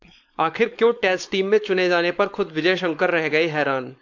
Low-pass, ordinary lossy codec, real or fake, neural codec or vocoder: 7.2 kHz; AAC, 48 kbps; fake; codec, 16 kHz, 4.8 kbps, FACodec